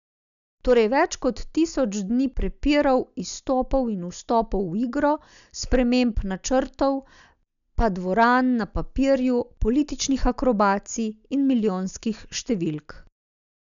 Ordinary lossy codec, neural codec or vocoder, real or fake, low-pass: MP3, 96 kbps; none; real; 7.2 kHz